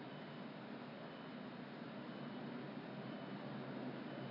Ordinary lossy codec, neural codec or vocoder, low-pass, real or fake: MP3, 32 kbps; none; 5.4 kHz; real